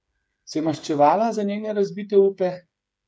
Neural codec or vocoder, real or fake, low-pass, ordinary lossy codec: codec, 16 kHz, 8 kbps, FreqCodec, smaller model; fake; none; none